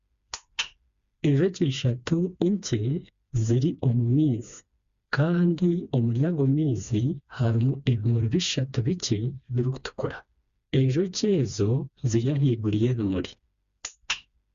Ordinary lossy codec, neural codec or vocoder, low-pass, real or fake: Opus, 64 kbps; codec, 16 kHz, 2 kbps, FreqCodec, smaller model; 7.2 kHz; fake